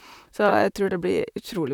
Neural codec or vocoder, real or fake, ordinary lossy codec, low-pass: vocoder, 44.1 kHz, 128 mel bands, Pupu-Vocoder; fake; none; 19.8 kHz